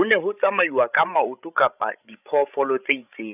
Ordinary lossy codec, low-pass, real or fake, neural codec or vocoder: none; 3.6 kHz; fake; codec, 16 kHz, 16 kbps, FreqCodec, larger model